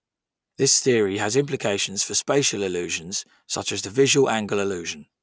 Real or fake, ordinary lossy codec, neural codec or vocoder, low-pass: real; none; none; none